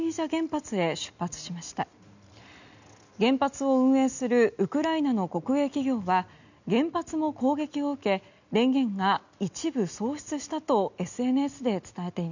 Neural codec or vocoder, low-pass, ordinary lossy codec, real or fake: none; 7.2 kHz; none; real